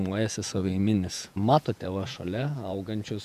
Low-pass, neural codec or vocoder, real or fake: 14.4 kHz; vocoder, 48 kHz, 128 mel bands, Vocos; fake